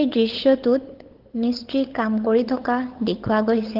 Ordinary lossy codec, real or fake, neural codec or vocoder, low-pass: Opus, 16 kbps; fake; codec, 16 kHz, 8 kbps, FunCodec, trained on LibriTTS, 25 frames a second; 5.4 kHz